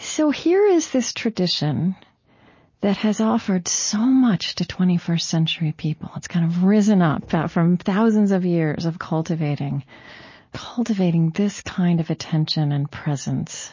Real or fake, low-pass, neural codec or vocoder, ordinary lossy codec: real; 7.2 kHz; none; MP3, 32 kbps